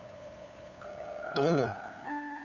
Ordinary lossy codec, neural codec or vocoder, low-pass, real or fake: none; codec, 16 kHz, 8 kbps, FunCodec, trained on LibriTTS, 25 frames a second; 7.2 kHz; fake